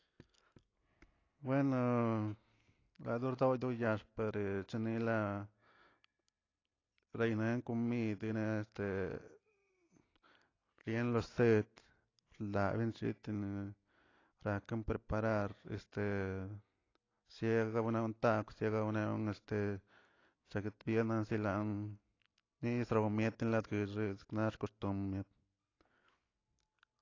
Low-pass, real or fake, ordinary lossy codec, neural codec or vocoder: 7.2 kHz; real; AAC, 32 kbps; none